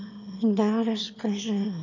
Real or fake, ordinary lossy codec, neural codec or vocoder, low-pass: fake; none; autoencoder, 22.05 kHz, a latent of 192 numbers a frame, VITS, trained on one speaker; 7.2 kHz